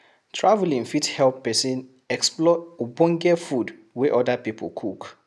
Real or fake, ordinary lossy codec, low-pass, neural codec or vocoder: real; none; none; none